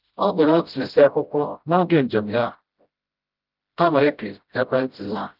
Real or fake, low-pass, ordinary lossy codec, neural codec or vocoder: fake; 5.4 kHz; Opus, 24 kbps; codec, 16 kHz, 0.5 kbps, FreqCodec, smaller model